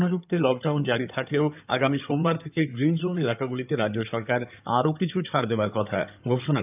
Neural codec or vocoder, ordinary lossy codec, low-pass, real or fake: codec, 16 kHz in and 24 kHz out, 2.2 kbps, FireRedTTS-2 codec; none; 3.6 kHz; fake